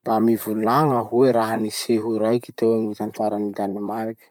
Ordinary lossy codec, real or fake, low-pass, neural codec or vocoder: none; fake; 19.8 kHz; vocoder, 44.1 kHz, 128 mel bands, Pupu-Vocoder